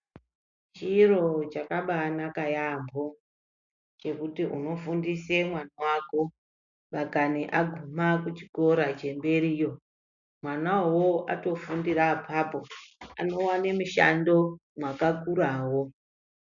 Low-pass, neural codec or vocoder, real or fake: 7.2 kHz; none; real